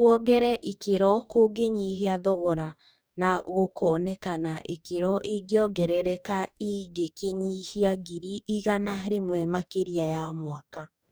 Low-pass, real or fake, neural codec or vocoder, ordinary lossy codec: none; fake; codec, 44.1 kHz, 2.6 kbps, DAC; none